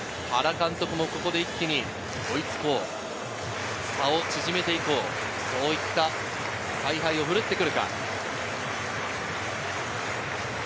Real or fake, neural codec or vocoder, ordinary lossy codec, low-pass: real; none; none; none